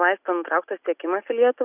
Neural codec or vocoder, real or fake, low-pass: none; real; 3.6 kHz